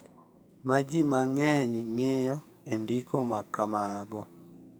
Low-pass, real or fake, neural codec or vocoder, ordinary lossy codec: none; fake; codec, 44.1 kHz, 2.6 kbps, SNAC; none